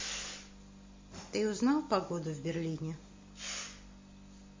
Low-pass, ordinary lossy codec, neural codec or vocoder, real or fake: 7.2 kHz; MP3, 32 kbps; none; real